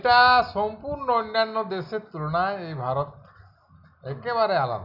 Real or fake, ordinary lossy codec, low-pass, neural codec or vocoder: real; none; 5.4 kHz; none